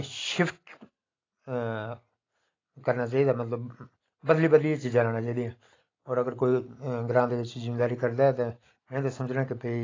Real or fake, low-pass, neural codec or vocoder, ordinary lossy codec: real; 7.2 kHz; none; AAC, 32 kbps